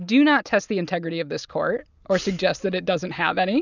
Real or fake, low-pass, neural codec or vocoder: real; 7.2 kHz; none